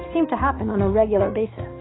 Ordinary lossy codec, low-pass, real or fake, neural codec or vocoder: AAC, 16 kbps; 7.2 kHz; real; none